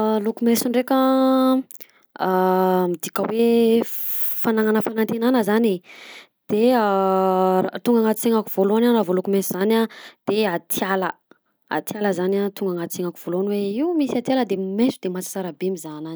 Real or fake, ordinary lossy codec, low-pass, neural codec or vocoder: real; none; none; none